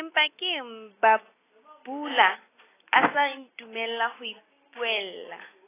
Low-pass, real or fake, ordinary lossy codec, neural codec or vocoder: 3.6 kHz; real; AAC, 16 kbps; none